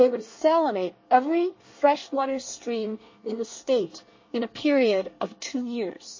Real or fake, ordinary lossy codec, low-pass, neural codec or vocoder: fake; MP3, 32 kbps; 7.2 kHz; codec, 24 kHz, 1 kbps, SNAC